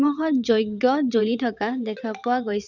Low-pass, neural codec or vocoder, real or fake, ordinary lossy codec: 7.2 kHz; vocoder, 22.05 kHz, 80 mel bands, WaveNeXt; fake; none